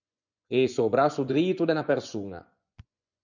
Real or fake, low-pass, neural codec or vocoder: fake; 7.2 kHz; vocoder, 24 kHz, 100 mel bands, Vocos